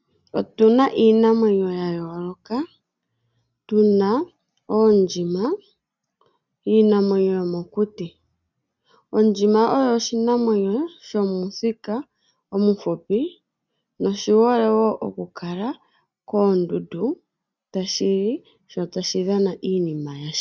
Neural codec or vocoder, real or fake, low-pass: none; real; 7.2 kHz